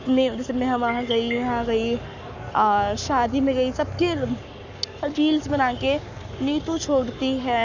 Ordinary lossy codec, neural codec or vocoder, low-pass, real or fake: none; codec, 44.1 kHz, 7.8 kbps, Pupu-Codec; 7.2 kHz; fake